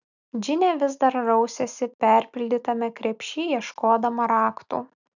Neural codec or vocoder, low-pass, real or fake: none; 7.2 kHz; real